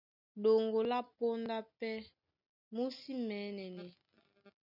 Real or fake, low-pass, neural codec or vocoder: real; 5.4 kHz; none